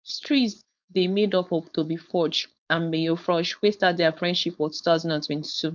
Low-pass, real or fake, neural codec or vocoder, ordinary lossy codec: 7.2 kHz; fake; codec, 16 kHz, 4.8 kbps, FACodec; none